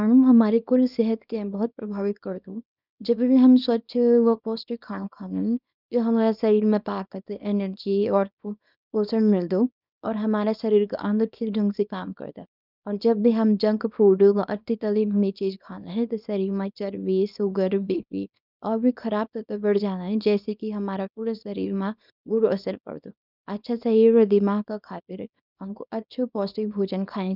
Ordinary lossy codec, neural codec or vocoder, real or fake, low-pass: none; codec, 24 kHz, 0.9 kbps, WavTokenizer, small release; fake; 5.4 kHz